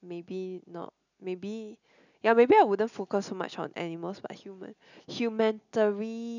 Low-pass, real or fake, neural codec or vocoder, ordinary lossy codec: 7.2 kHz; real; none; none